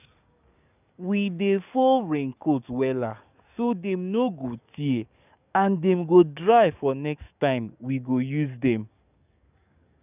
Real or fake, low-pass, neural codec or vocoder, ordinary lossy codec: fake; 3.6 kHz; codec, 44.1 kHz, 7.8 kbps, DAC; none